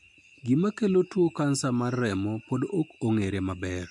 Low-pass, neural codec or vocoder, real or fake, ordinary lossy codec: 10.8 kHz; none; real; MP3, 64 kbps